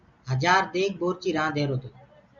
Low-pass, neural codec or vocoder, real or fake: 7.2 kHz; none; real